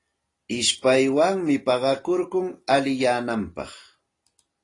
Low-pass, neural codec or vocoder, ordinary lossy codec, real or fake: 10.8 kHz; none; AAC, 32 kbps; real